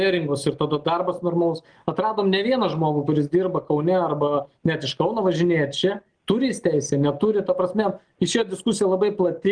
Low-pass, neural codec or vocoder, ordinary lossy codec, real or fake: 9.9 kHz; none; Opus, 24 kbps; real